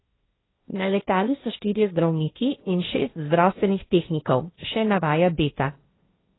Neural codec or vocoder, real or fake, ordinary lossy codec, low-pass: codec, 16 kHz, 1.1 kbps, Voila-Tokenizer; fake; AAC, 16 kbps; 7.2 kHz